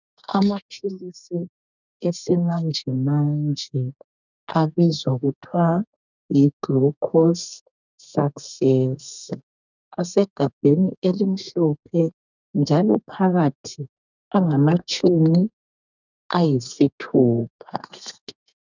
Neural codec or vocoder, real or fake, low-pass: codec, 32 kHz, 1.9 kbps, SNAC; fake; 7.2 kHz